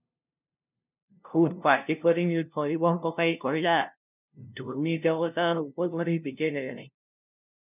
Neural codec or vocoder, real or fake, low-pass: codec, 16 kHz, 0.5 kbps, FunCodec, trained on LibriTTS, 25 frames a second; fake; 3.6 kHz